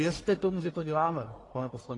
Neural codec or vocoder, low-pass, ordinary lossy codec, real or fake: codec, 44.1 kHz, 1.7 kbps, Pupu-Codec; 10.8 kHz; AAC, 32 kbps; fake